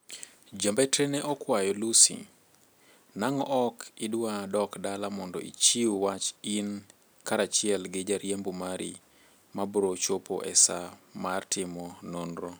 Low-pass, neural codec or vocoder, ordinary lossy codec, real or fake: none; none; none; real